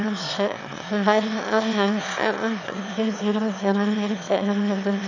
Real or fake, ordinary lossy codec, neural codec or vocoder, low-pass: fake; none; autoencoder, 22.05 kHz, a latent of 192 numbers a frame, VITS, trained on one speaker; 7.2 kHz